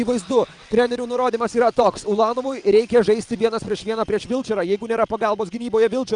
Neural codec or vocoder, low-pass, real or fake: vocoder, 22.05 kHz, 80 mel bands, WaveNeXt; 9.9 kHz; fake